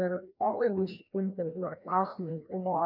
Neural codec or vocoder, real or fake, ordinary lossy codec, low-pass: codec, 16 kHz, 1 kbps, FreqCodec, larger model; fake; MP3, 48 kbps; 5.4 kHz